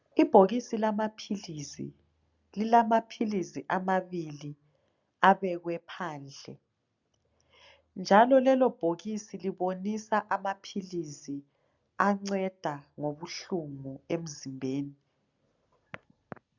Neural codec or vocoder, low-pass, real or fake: none; 7.2 kHz; real